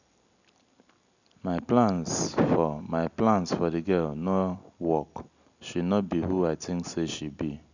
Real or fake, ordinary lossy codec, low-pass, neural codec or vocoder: real; none; 7.2 kHz; none